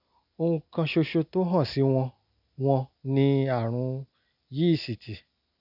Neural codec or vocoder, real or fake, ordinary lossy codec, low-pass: none; real; none; 5.4 kHz